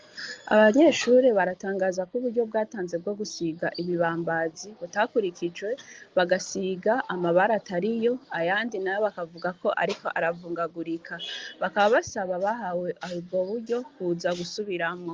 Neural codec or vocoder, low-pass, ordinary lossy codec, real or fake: none; 7.2 kHz; Opus, 32 kbps; real